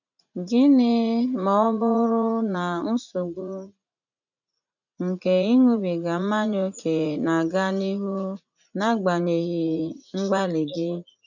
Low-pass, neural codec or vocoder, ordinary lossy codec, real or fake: 7.2 kHz; vocoder, 44.1 kHz, 80 mel bands, Vocos; none; fake